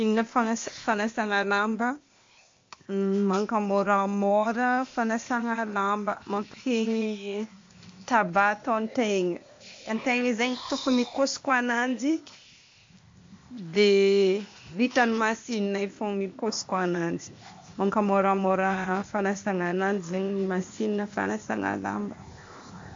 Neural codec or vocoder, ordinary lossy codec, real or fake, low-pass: codec, 16 kHz, 0.8 kbps, ZipCodec; MP3, 48 kbps; fake; 7.2 kHz